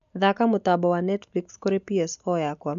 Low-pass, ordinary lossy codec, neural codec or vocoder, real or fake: 7.2 kHz; none; none; real